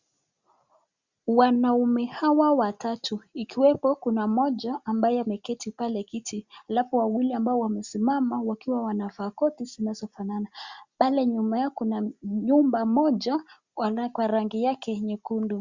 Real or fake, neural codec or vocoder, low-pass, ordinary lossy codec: real; none; 7.2 kHz; Opus, 64 kbps